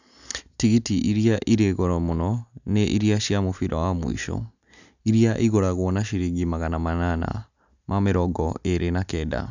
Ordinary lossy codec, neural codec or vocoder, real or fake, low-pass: none; none; real; 7.2 kHz